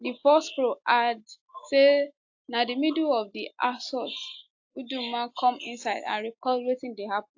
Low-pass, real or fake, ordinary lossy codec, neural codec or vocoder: 7.2 kHz; real; AAC, 48 kbps; none